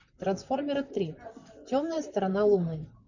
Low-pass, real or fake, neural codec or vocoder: 7.2 kHz; fake; vocoder, 44.1 kHz, 128 mel bands, Pupu-Vocoder